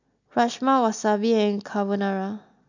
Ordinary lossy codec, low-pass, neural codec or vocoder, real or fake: none; 7.2 kHz; none; real